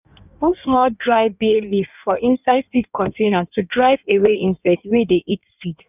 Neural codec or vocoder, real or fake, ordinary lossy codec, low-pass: codec, 16 kHz in and 24 kHz out, 1.1 kbps, FireRedTTS-2 codec; fake; none; 3.6 kHz